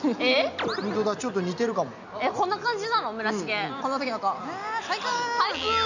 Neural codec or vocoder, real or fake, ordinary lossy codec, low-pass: none; real; none; 7.2 kHz